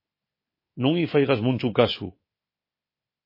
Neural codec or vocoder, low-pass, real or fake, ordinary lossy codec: codec, 24 kHz, 3.1 kbps, DualCodec; 5.4 kHz; fake; MP3, 24 kbps